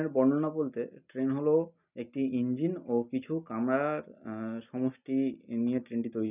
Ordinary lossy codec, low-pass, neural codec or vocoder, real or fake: none; 3.6 kHz; none; real